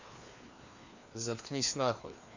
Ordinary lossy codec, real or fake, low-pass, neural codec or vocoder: Opus, 64 kbps; fake; 7.2 kHz; codec, 16 kHz, 2 kbps, FreqCodec, larger model